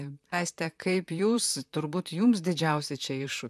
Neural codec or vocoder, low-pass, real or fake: vocoder, 44.1 kHz, 128 mel bands, Pupu-Vocoder; 14.4 kHz; fake